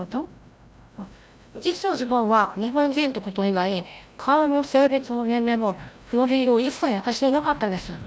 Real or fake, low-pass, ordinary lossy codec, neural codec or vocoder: fake; none; none; codec, 16 kHz, 0.5 kbps, FreqCodec, larger model